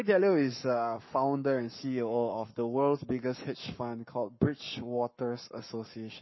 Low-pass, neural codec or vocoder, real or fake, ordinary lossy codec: 7.2 kHz; codec, 44.1 kHz, 7.8 kbps, Pupu-Codec; fake; MP3, 24 kbps